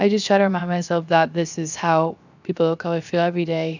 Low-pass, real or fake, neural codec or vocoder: 7.2 kHz; fake; codec, 16 kHz, 0.7 kbps, FocalCodec